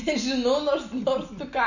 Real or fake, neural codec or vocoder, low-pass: real; none; 7.2 kHz